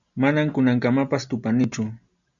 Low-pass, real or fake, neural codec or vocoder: 7.2 kHz; real; none